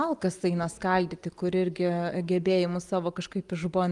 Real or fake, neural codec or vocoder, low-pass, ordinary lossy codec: real; none; 10.8 kHz; Opus, 32 kbps